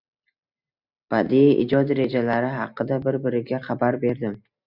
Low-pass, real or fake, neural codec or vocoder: 5.4 kHz; real; none